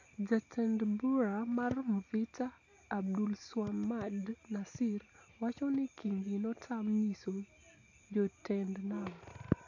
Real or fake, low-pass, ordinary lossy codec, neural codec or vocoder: real; 7.2 kHz; AAC, 48 kbps; none